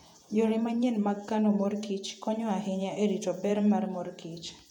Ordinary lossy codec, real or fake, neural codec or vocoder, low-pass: none; fake; vocoder, 48 kHz, 128 mel bands, Vocos; 19.8 kHz